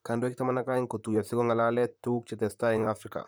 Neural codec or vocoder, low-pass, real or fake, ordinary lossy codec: vocoder, 44.1 kHz, 128 mel bands, Pupu-Vocoder; none; fake; none